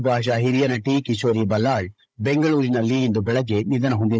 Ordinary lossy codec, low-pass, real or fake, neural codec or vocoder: none; none; fake; codec, 16 kHz, 16 kbps, FunCodec, trained on Chinese and English, 50 frames a second